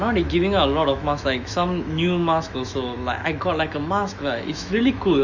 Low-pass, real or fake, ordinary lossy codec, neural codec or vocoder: 7.2 kHz; fake; none; autoencoder, 48 kHz, 128 numbers a frame, DAC-VAE, trained on Japanese speech